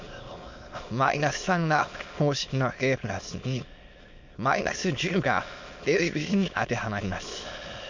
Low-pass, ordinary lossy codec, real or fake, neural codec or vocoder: 7.2 kHz; MP3, 48 kbps; fake; autoencoder, 22.05 kHz, a latent of 192 numbers a frame, VITS, trained on many speakers